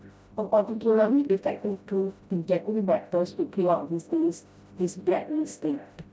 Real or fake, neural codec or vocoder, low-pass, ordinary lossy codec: fake; codec, 16 kHz, 0.5 kbps, FreqCodec, smaller model; none; none